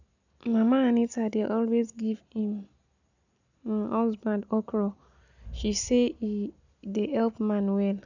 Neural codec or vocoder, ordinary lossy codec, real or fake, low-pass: none; none; real; 7.2 kHz